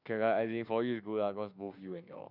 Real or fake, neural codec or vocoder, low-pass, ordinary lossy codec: fake; autoencoder, 48 kHz, 32 numbers a frame, DAC-VAE, trained on Japanese speech; 5.4 kHz; none